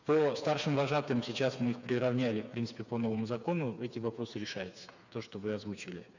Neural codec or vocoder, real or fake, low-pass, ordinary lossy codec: codec, 16 kHz, 4 kbps, FreqCodec, smaller model; fake; 7.2 kHz; none